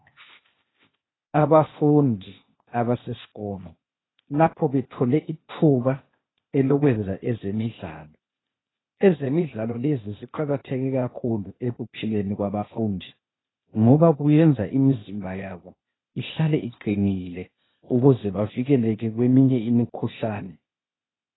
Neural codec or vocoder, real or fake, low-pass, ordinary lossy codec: codec, 16 kHz, 0.8 kbps, ZipCodec; fake; 7.2 kHz; AAC, 16 kbps